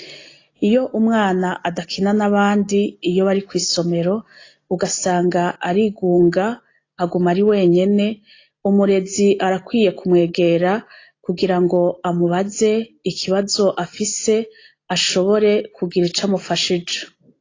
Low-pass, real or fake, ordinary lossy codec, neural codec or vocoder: 7.2 kHz; real; AAC, 32 kbps; none